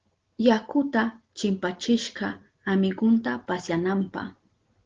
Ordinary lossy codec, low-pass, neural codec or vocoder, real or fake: Opus, 16 kbps; 7.2 kHz; none; real